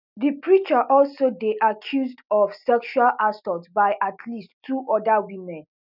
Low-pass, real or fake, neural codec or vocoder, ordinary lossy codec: 5.4 kHz; real; none; none